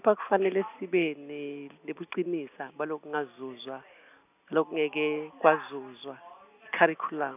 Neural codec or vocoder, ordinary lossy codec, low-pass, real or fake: none; none; 3.6 kHz; real